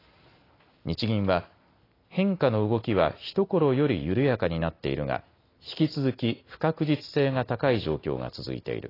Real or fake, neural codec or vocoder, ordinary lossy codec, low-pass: real; none; AAC, 24 kbps; 5.4 kHz